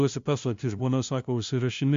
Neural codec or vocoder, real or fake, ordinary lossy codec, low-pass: codec, 16 kHz, 0.5 kbps, FunCodec, trained on LibriTTS, 25 frames a second; fake; MP3, 48 kbps; 7.2 kHz